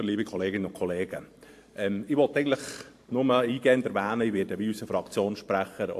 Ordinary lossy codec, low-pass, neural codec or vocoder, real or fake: AAC, 64 kbps; 14.4 kHz; vocoder, 44.1 kHz, 128 mel bands every 512 samples, BigVGAN v2; fake